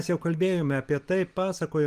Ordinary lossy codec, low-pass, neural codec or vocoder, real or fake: Opus, 24 kbps; 14.4 kHz; none; real